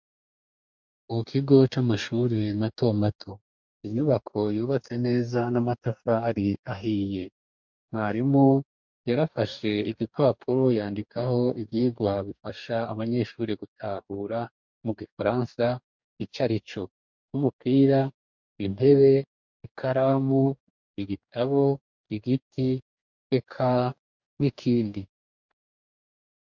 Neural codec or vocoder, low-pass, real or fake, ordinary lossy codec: codec, 44.1 kHz, 2.6 kbps, DAC; 7.2 kHz; fake; MP3, 64 kbps